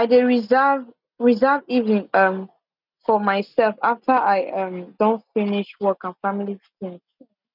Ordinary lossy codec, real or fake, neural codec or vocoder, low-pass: none; real; none; 5.4 kHz